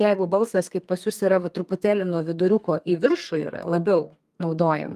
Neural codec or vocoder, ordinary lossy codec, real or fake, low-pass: codec, 32 kHz, 1.9 kbps, SNAC; Opus, 32 kbps; fake; 14.4 kHz